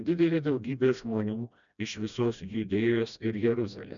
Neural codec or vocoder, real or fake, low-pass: codec, 16 kHz, 1 kbps, FreqCodec, smaller model; fake; 7.2 kHz